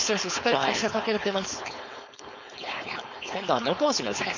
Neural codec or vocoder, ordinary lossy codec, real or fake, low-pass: codec, 16 kHz, 4.8 kbps, FACodec; none; fake; 7.2 kHz